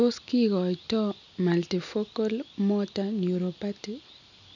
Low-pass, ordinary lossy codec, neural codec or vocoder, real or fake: 7.2 kHz; none; none; real